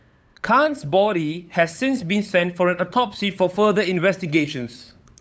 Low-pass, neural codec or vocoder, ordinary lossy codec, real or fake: none; codec, 16 kHz, 8 kbps, FunCodec, trained on LibriTTS, 25 frames a second; none; fake